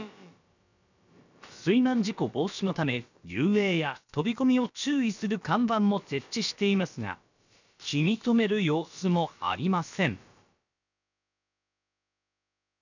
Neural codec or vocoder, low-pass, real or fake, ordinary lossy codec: codec, 16 kHz, about 1 kbps, DyCAST, with the encoder's durations; 7.2 kHz; fake; none